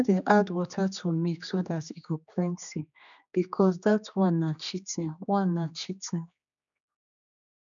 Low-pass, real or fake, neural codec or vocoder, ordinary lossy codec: 7.2 kHz; fake; codec, 16 kHz, 2 kbps, X-Codec, HuBERT features, trained on general audio; none